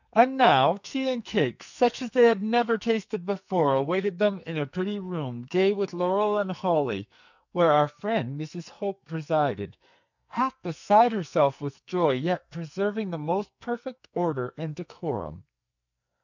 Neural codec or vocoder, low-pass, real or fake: codec, 44.1 kHz, 2.6 kbps, SNAC; 7.2 kHz; fake